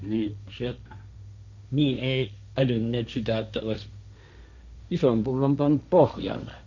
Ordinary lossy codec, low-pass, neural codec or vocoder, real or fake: none; 7.2 kHz; codec, 16 kHz, 1.1 kbps, Voila-Tokenizer; fake